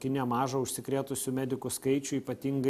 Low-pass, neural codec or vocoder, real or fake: 14.4 kHz; none; real